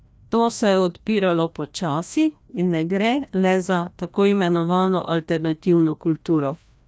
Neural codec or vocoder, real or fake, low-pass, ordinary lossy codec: codec, 16 kHz, 1 kbps, FreqCodec, larger model; fake; none; none